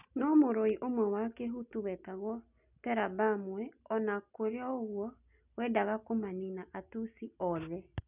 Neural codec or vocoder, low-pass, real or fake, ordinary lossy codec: none; 3.6 kHz; real; none